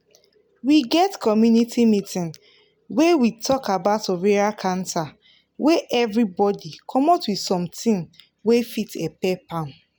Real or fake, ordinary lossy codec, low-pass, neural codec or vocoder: real; none; none; none